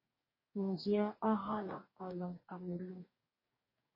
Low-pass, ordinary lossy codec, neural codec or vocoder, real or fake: 5.4 kHz; MP3, 24 kbps; codec, 44.1 kHz, 2.6 kbps, DAC; fake